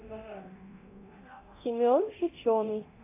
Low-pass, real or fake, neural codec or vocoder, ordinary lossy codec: 3.6 kHz; fake; codec, 24 kHz, 0.9 kbps, DualCodec; AAC, 24 kbps